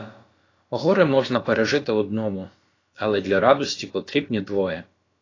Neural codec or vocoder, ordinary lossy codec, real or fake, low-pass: codec, 16 kHz, about 1 kbps, DyCAST, with the encoder's durations; AAC, 32 kbps; fake; 7.2 kHz